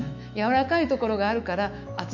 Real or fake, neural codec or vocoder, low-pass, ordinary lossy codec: fake; autoencoder, 48 kHz, 128 numbers a frame, DAC-VAE, trained on Japanese speech; 7.2 kHz; none